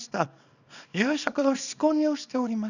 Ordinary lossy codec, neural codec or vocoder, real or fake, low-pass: none; codec, 24 kHz, 0.9 kbps, WavTokenizer, small release; fake; 7.2 kHz